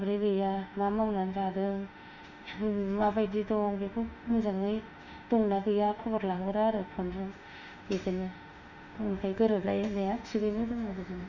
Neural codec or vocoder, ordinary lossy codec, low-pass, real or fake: autoencoder, 48 kHz, 32 numbers a frame, DAC-VAE, trained on Japanese speech; none; 7.2 kHz; fake